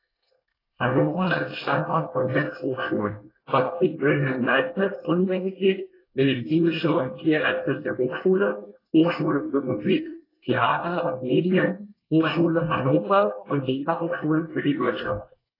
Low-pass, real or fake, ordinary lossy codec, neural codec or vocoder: 5.4 kHz; fake; AAC, 24 kbps; codec, 24 kHz, 1 kbps, SNAC